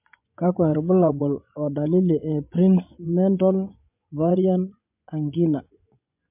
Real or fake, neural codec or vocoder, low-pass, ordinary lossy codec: fake; vocoder, 24 kHz, 100 mel bands, Vocos; 3.6 kHz; MP3, 32 kbps